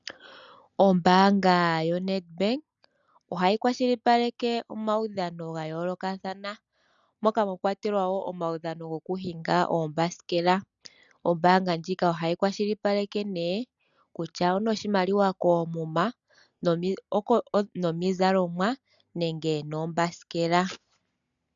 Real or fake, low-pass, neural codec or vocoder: real; 7.2 kHz; none